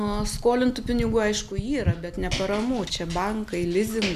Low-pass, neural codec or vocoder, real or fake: 14.4 kHz; none; real